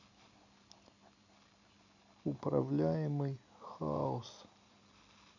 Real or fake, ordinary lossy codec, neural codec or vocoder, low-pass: real; none; none; 7.2 kHz